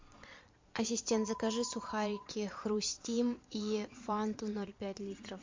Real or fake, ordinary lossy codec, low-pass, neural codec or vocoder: fake; MP3, 48 kbps; 7.2 kHz; vocoder, 22.05 kHz, 80 mel bands, Vocos